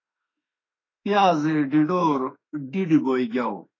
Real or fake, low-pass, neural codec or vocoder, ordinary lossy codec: fake; 7.2 kHz; autoencoder, 48 kHz, 32 numbers a frame, DAC-VAE, trained on Japanese speech; AAC, 32 kbps